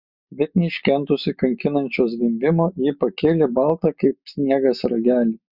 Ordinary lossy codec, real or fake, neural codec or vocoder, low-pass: Opus, 64 kbps; real; none; 5.4 kHz